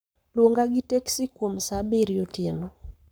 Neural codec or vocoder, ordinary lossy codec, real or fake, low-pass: codec, 44.1 kHz, 7.8 kbps, Pupu-Codec; none; fake; none